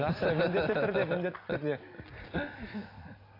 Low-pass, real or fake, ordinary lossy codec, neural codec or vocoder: 5.4 kHz; real; AAC, 32 kbps; none